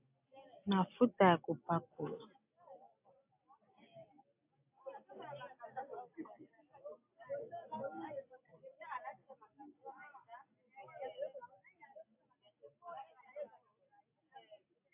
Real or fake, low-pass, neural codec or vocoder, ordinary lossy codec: real; 3.6 kHz; none; AAC, 32 kbps